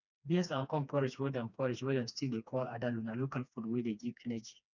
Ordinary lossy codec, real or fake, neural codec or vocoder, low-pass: none; fake; codec, 16 kHz, 2 kbps, FreqCodec, smaller model; 7.2 kHz